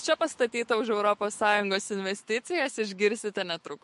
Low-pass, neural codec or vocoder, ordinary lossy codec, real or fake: 14.4 kHz; autoencoder, 48 kHz, 128 numbers a frame, DAC-VAE, trained on Japanese speech; MP3, 48 kbps; fake